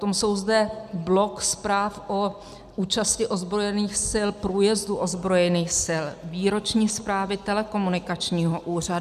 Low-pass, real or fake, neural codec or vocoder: 14.4 kHz; real; none